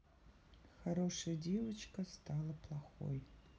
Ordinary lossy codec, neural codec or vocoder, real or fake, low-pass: none; none; real; none